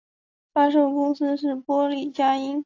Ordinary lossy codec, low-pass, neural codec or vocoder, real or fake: AAC, 48 kbps; 7.2 kHz; none; real